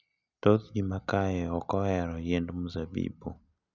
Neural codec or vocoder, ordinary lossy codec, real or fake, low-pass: none; none; real; 7.2 kHz